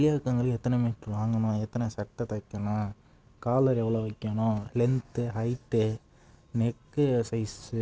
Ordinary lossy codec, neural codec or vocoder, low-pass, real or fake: none; none; none; real